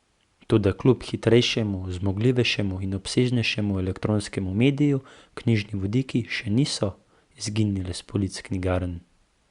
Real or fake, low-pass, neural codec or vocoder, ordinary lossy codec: real; 10.8 kHz; none; Opus, 64 kbps